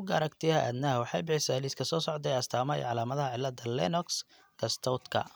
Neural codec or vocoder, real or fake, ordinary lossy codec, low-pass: none; real; none; none